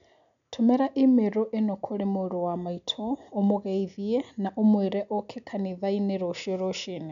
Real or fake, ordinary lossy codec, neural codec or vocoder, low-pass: real; none; none; 7.2 kHz